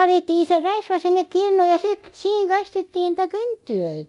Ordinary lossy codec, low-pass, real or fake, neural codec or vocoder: none; 10.8 kHz; fake; codec, 24 kHz, 0.9 kbps, DualCodec